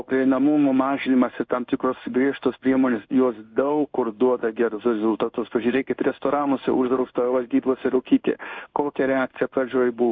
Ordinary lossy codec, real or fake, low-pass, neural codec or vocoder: MP3, 64 kbps; fake; 7.2 kHz; codec, 16 kHz in and 24 kHz out, 1 kbps, XY-Tokenizer